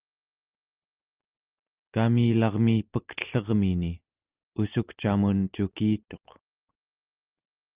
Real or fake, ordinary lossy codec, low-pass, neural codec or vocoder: real; Opus, 32 kbps; 3.6 kHz; none